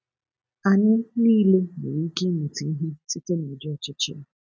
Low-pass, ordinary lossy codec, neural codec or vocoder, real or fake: none; none; none; real